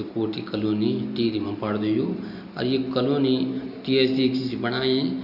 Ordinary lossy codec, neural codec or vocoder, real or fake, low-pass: none; none; real; 5.4 kHz